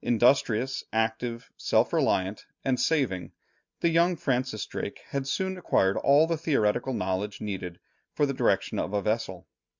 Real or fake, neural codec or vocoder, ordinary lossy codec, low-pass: real; none; MP3, 64 kbps; 7.2 kHz